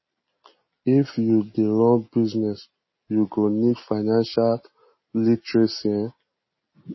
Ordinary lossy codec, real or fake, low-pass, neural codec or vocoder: MP3, 24 kbps; real; 7.2 kHz; none